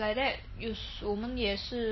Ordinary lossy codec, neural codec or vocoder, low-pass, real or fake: MP3, 24 kbps; none; 7.2 kHz; real